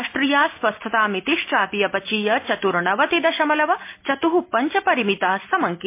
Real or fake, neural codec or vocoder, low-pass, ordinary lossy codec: real; none; 3.6 kHz; MP3, 24 kbps